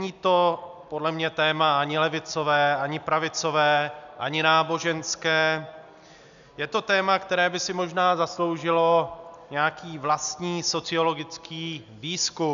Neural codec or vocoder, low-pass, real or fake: none; 7.2 kHz; real